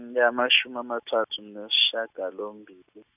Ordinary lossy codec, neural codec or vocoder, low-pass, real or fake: none; none; 3.6 kHz; real